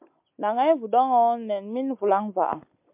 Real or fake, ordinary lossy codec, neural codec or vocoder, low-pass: real; MP3, 32 kbps; none; 3.6 kHz